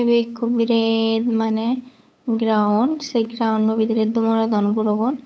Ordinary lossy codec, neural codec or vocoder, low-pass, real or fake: none; codec, 16 kHz, 8 kbps, FunCodec, trained on LibriTTS, 25 frames a second; none; fake